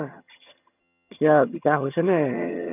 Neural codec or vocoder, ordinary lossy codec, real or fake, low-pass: vocoder, 22.05 kHz, 80 mel bands, HiFi-GAN; none; fake; 3.6 kHz